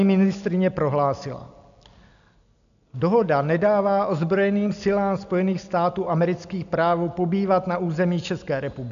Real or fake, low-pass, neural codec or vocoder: real; 7.2 kHz; none